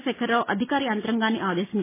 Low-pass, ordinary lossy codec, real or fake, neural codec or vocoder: 3.6 kHz; MP3, 16 kbps; real; none